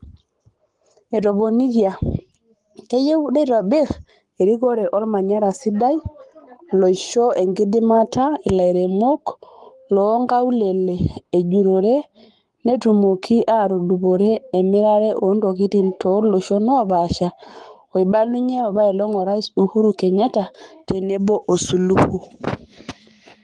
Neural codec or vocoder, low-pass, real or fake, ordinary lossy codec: codec, 44.1 kHz, 7.8 kbps, Pupu-Codec; 10.8 kHz; fake; Opus, 24 kbps